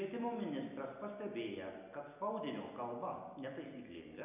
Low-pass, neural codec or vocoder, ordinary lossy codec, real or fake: 3.6 kHz; none; MP3, 32 kbps; real